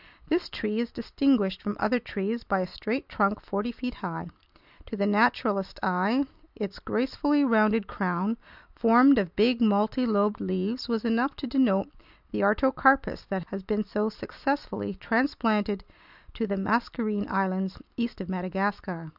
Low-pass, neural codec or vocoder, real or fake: 5.4 kHz; none; real